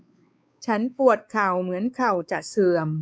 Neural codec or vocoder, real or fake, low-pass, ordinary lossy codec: codec, 16 kHz, 4 kbps, X-Codec, WavLM features, trained on Multilingual LibriSpeech; fake; none; none